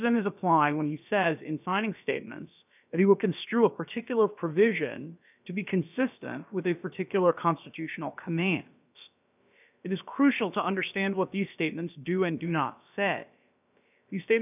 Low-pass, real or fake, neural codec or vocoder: 3.6 kHz; fake; codec, 16 kHz, about 1 kbps, DyCAST, with the encoder's durations